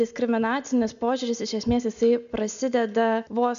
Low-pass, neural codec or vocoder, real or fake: 7.2 kHz; none; real